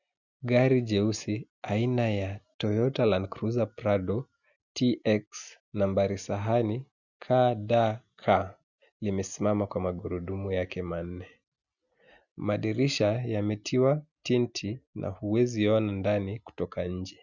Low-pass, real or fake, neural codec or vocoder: 7.2 kHz; real; none